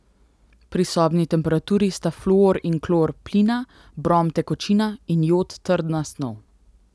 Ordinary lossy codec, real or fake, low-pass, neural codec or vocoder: none; real; none; none